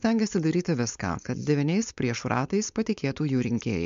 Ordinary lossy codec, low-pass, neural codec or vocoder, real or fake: MP3, 64 kbps; 7.2 kHz; codec, 16 kHz, 4.8 kbps, FACodec; fake